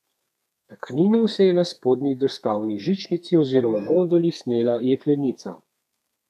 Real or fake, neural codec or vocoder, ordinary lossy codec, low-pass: fake; codec, 32 kHz, 1.9 kbps, SNAC; none; 14.4 kHz